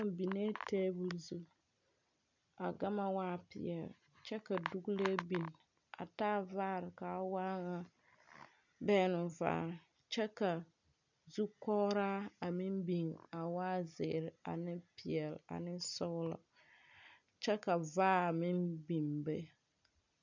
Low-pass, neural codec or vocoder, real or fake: 7.2 kHz; none; real